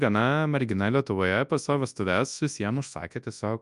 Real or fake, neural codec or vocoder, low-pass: fake; codec, 24 kHz, 0.9 kbps, WavTokenizer, large speech release; 10.8 kHz